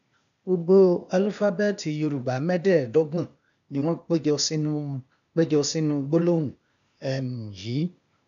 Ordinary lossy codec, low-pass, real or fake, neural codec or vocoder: none; 7.2 kHz; fake; codec, 16 kHz, 0.8 kbps, ZipCodec